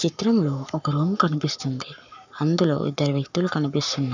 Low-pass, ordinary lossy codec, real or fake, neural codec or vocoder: 7.2 kHz; none; fake; codec, 44.1 kHz, 7.8 kbps, Pupu-Codec